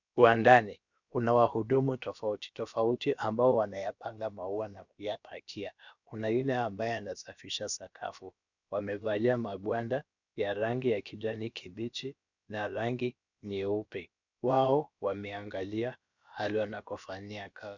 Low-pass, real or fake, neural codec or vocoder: 7.2 kHz; fake; codec, 16 kHz, about 1 kbps, DyCAST, with the encoder's durations